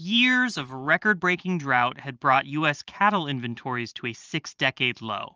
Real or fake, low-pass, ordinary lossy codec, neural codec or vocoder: fake; 7.2 kHz; Opus, 24 kbps; vocoder, 44.1 kHz, 128 mel bands every 512 samples, BigVGAN v2